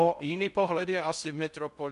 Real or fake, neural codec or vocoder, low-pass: fake; codec, 16 kHz in and 24 kHz out, 0.6 kbps, FocalCodec, streaming, 4096 codes; 10.8 kHz